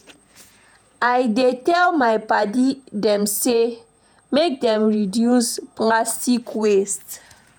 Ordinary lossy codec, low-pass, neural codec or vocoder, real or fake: none; none; vocoder, 48 kHz, 128 mel bands, Vocos; fake